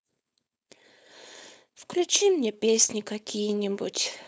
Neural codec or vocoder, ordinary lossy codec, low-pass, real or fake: codec, 16 kHz, 4.8 kbps, FACodec; none; none; fake